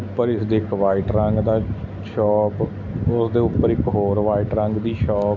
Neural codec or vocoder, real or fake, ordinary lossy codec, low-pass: none; real; AAC, 48 kbps; 7.2 kHz